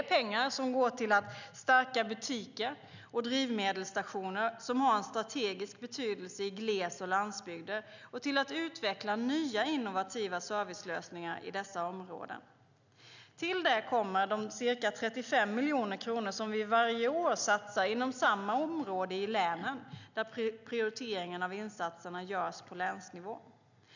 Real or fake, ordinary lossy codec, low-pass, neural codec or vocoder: real; none; 7.2 kHz; none